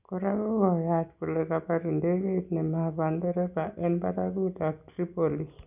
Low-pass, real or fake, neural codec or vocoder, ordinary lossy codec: 3.6 kHz; real; none; none